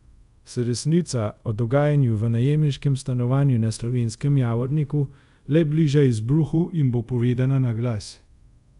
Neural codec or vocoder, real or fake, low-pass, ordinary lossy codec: codec, 24 kHz, 0.5 kbps, DualCodec; fake; 10.8 kHz; MP3, 96 kbps